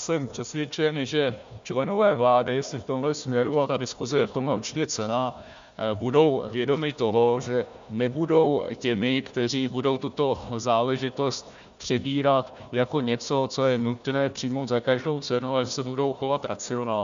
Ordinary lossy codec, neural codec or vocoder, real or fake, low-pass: MP3, 64 kbps; codec, 16 kHz, 1 kbps, FunCodec, trained on Chinese and English, 50 frames a second; fake; 7.2 kHz